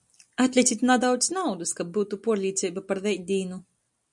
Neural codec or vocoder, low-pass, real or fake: none; 10.8 kHz; real